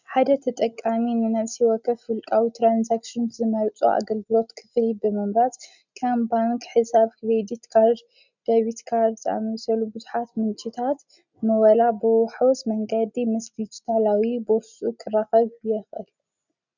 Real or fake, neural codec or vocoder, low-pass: real; none; 7.2 kHz